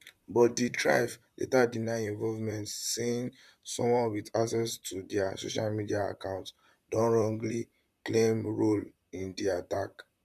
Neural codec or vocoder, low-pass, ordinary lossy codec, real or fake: vocoder, 44.1 kHz, 128 mel bands, Pupu-Vocoder; 14.4 kHz; none; fake